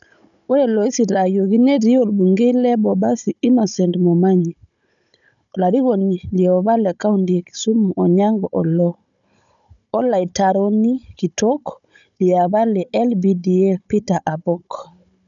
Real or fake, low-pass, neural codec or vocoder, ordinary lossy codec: fake; 7.2 kHz; codec, 16 kHz, 16 kbps, FunCodec, trained on Chinese and English, 50 frames a second; none